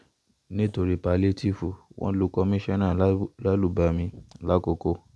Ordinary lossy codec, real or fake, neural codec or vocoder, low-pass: none; real; none; none